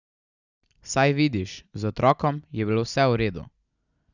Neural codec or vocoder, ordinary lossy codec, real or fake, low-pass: none; none; real; 7.2 kHz